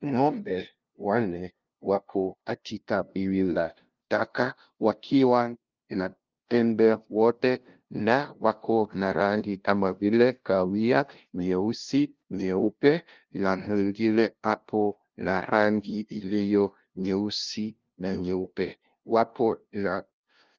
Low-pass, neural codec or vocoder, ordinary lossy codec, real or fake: 7.2 kHz; codec, 16 kHz, 0.5 kbps, FunCodec, trained on LibriTTS, 25 frames a second; Opus, 32 kbps; fake